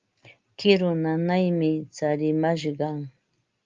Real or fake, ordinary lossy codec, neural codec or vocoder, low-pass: real; Opus, 32 kbps; none; 7.2 kHz